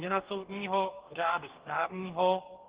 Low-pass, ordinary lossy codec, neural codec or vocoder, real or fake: 3.6 kHz; Opus, 16 kbps; codec, 44.1 kHz, 2.6 kbps, DAC; fake